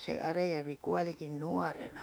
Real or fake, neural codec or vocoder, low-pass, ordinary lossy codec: fake; autoencoder, 48 kHz, 32 numbers a frame, DAC-VAE, trained on Japanese speech; none; none